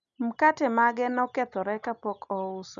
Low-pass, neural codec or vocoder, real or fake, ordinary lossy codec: 7.2 kHz; none; real; none